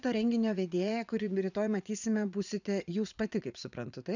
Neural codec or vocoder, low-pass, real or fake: vocoder, 44.1 kHz, 80 mel bands, Vocos; 7.2 kHz; fake